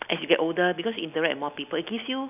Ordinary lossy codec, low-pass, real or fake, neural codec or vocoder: none; 3.6 kHz; real; none